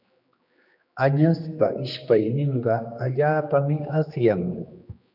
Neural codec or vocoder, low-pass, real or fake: codec, 16 kHz, 2 kbps, X-Codec, HuBERT features, trained on general audio; 5.4 kHz; fake